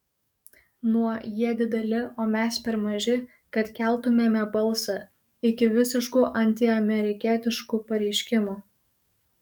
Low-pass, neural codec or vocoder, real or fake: 19.8 kHz; codec, 44.1 kHz, 7.8 kbps, DAC; fake